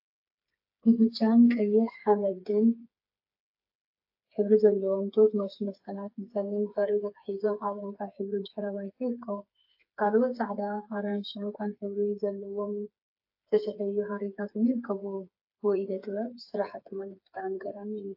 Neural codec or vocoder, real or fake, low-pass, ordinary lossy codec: codec, 16 kHz, 4 kbps, FreqCodec, smaller model; fake; 5.4 kHz; AAC, 48 kbps